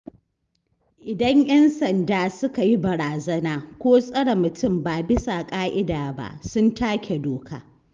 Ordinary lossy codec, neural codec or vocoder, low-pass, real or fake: Opus, 32 kbps; none; 7.2 kHz; real